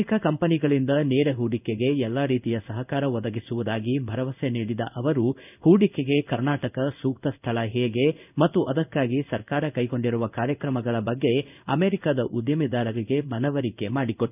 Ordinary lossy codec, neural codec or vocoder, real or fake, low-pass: none; codec, 16 kHz in and 24 kHz out, 1 kbps, XY-Tokenizer; fake; 3.6 kHz